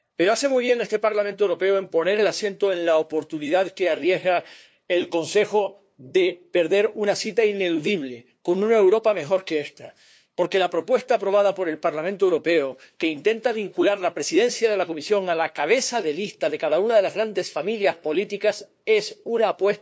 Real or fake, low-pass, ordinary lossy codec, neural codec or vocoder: fake; none; none; codec, 16 kHz, 2 kbps, FunCodec, trained on LibriTTS, 25 frames a second